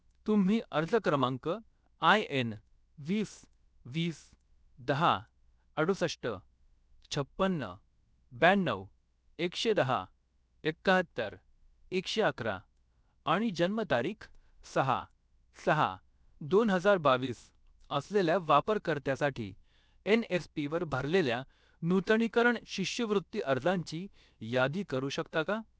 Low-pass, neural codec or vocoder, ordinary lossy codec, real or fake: none; codec, 16 kHz, 0.7 kbps, FocalCodec; none; fake